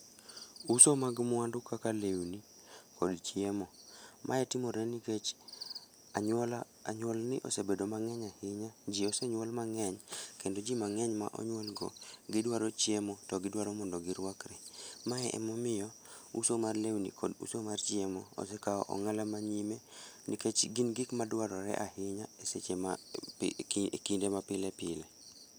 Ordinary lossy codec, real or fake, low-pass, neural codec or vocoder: none; real; none; none